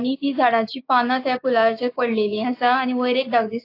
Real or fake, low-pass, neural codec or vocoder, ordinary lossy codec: fake; 5.4 kHz; codec, 16 kHz, 6 kbps, DAC; AAC, 32 kbps